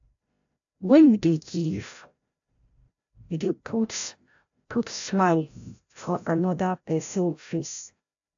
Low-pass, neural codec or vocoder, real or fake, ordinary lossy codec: 7.2 kHz; codec, 16 kHz, 0.5 kbps, FreqCodec, larger model; fake; AAC, 64 kbps